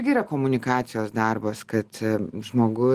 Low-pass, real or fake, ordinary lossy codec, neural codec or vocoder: 14.4 kHz; real; Opus, 24 kbps; none